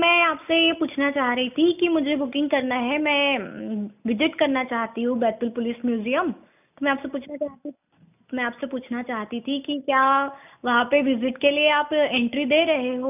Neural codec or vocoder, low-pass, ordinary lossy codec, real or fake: none; 3.6 kHz; none; real